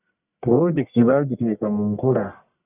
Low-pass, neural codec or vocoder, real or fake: 3.6 kHz; codec, 44.1 kHz, 1.7 kbps, Pupu-Codec; fake